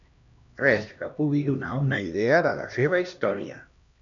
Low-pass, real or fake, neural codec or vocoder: 7.2 kHz; fake; codec, 16 kHz, 1 kbps, X-Codec, HuBERT features, trained on LibriSpeech